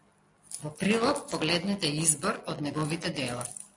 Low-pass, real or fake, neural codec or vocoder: 10.8 kHz; real; none